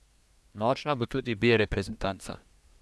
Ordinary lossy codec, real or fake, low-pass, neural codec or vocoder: none; fake; none; codec, 24 kHz, 1 kbps, SNAC